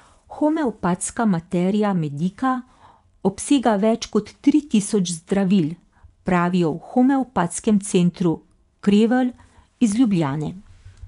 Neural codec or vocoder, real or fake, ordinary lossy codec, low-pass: vocoder, 24 kHz, 100 mel bands, Vocos; fake; none; 10.8 kHz